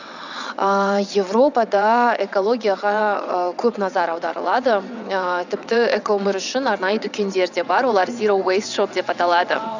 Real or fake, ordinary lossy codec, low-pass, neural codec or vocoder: fake; none; 7.2 kHz; vocoder, 22.05 kHz, 80 mel bands, WaveNeXt